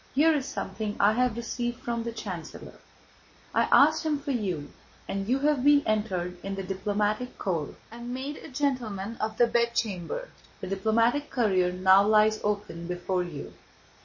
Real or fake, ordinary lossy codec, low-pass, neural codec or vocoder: real; MP3, 32 kbps; 7.2 kHz; none